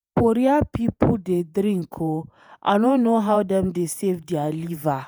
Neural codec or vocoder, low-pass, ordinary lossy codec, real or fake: vocoder, 48 kHz, 128 mel bands, Vocos; none; none; fake